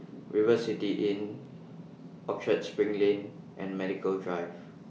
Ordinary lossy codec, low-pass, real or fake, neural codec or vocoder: none; none; real; none